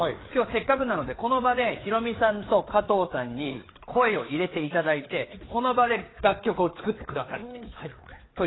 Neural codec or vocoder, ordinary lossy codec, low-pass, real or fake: codec, 16 kHz, 4 kbps, FreqCodec, larger model; AAC, 16 kbps; 7.2 kHz; fake